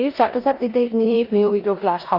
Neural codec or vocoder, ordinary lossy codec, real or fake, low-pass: codec, 16 kHz in and 24 kHz out, 0.9 kbps, LongCat-Audio-Codec, four codebook decoder; Opus, 64 kbps; fake; 5.4 kHz